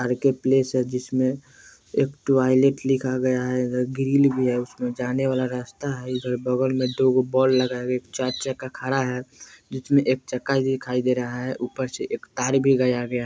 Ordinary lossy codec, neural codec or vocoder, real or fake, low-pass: none; none; real; none